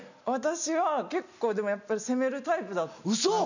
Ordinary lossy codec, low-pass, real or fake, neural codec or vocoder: none; 7.2 kHz; real; none